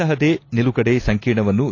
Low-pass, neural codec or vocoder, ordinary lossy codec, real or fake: 7.2 kHz; none; AAC, 32 kbps; real